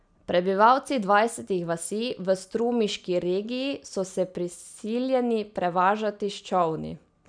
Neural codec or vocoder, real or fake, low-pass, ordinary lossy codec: none; real; 9.9 kHz; none